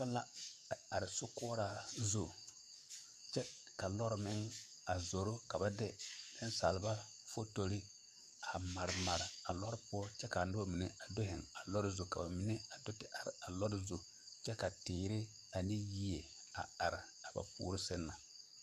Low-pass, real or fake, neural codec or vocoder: 14.4 kHz; fake; codec, 44.1 kHz, 7.8 kbps, DAC